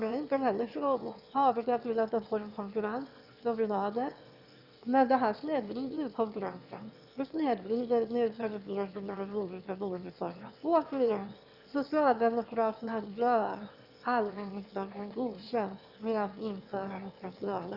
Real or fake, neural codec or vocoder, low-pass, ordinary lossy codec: fake; autoencoder, 22.05 kHz, a latent of 192 numbers a frame, VITS, trained on one speaker; 5.4 kHz; Opus, 64 kbps